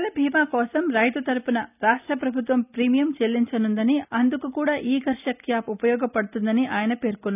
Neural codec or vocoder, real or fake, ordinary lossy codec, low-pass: none; real; none; 3.6 kHz